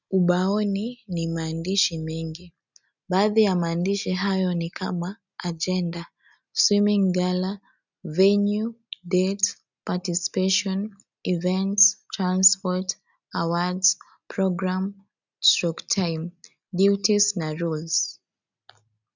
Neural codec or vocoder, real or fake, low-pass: none; real; 7.2 kHz